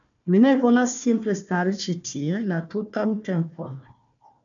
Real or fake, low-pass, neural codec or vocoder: fake; 7.2 kHz; codec, 16 kHz, 1 kbps, FunCodec, trained on Chinese and English, 50 frames a second